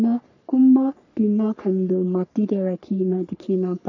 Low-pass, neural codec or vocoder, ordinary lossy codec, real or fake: 7.2 kHz; codec, 44.1 kHz, 3.4 kbps, Pupu-Codec; none; fake